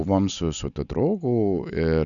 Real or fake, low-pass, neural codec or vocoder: real; 7.2 kHz; none